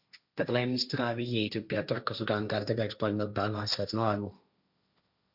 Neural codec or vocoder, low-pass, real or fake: codec, 16 kHz, 1.1 kbps, Voila-Tokenizer; 5.4 kHz; fake